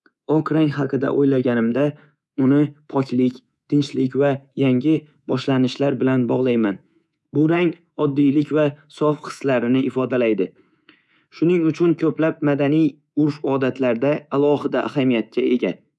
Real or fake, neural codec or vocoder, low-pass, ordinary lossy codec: fake; codec, 24 kHz, 3.1 kbps, DualCodec; none; none